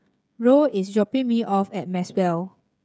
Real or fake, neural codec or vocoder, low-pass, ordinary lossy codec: fake; codec, 16 kHz, 16 kbps, FreqCodec, smaller model; none; none